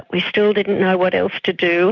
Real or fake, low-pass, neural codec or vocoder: real; 7.2 kHz; none